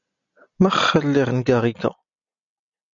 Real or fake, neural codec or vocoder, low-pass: real; none; 7.2 kHz